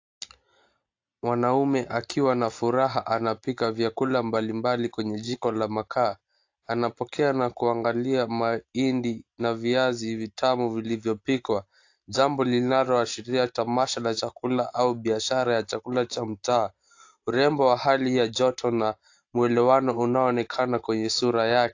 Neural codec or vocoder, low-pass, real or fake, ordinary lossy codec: none; 7.2 kHz; real; AAC, 48 kbps